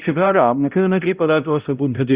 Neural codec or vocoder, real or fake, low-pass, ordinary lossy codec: codec, 16 kHz, 0.5 kbps, X-Codec, HuBERT features, trained on balanced general audio; fake; 3.6 kHz; Opus, 64 kbps